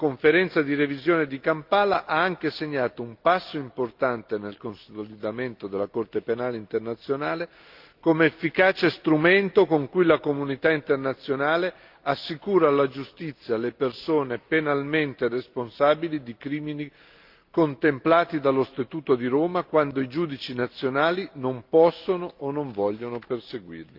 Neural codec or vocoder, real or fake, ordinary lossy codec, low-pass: none; real; Opus, 32 kbps; 5.4 kHz